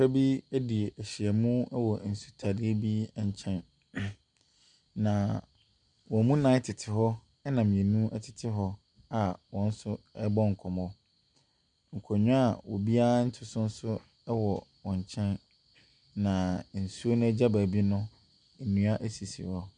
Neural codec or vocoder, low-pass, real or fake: none; 9.9 kHz; real